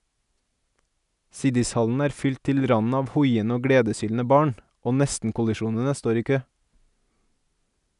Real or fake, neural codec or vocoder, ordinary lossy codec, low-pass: real; none; none; 10.8 kHz